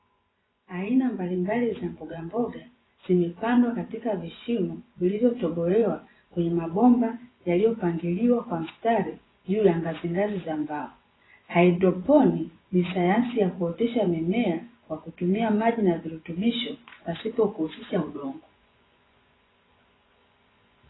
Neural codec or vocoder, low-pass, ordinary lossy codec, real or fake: vocoder, 24 kHz, 100 mel bands, Vocos; 7.2 kHz; AAC, 16 kbps; fake